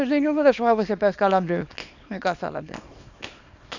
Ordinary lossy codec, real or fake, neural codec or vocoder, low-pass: none; fake; codec, 24 kHz, 0.9 kbps, WavTokenizer, small release; 7.2 kHz